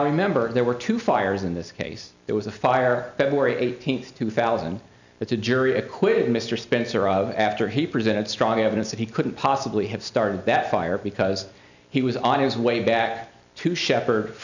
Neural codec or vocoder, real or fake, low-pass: none; real; 7.2 kHz